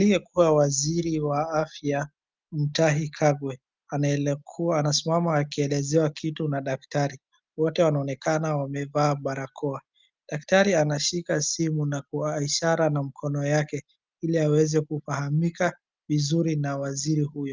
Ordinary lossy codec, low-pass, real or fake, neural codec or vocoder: Opus, 24 kbps; 7.2 kHz; real; none